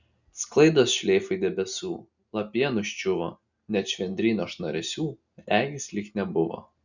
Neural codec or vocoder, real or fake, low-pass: none; real; 7.2 kHz